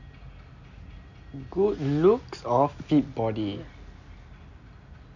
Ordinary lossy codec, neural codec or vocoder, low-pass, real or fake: none; none; 7.2 kHz; real